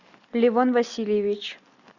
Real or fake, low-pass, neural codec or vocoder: real; 7.2 kHz; none